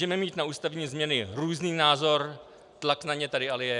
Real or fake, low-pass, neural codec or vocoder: real; 10.8 kHz; none